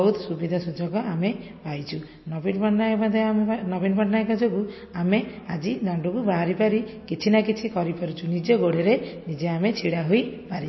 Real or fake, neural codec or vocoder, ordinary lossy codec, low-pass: real; none; MP3, 24 kbps; 7.2 kHz